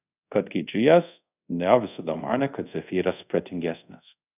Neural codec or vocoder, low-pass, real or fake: codec, 24 kHz, 0.5 kbps, DualCodec; 3.6 kHz; fake